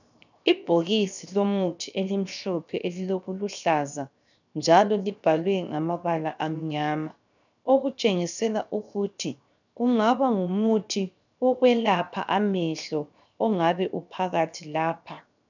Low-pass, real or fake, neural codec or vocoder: 7.2 kHz; fake; codec, 16 kHz, 0.7 kbps, FocalCodec